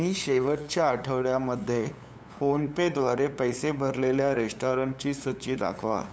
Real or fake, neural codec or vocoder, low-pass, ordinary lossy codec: fake; codec, 16 kHz, 8 kbps, FunCodec, trained on LibriTTS, 25 frames a second; none; none